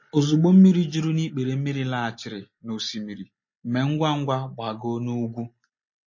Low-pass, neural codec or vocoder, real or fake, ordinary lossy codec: 7.2 kHz; none; real; MP3, 32 kbps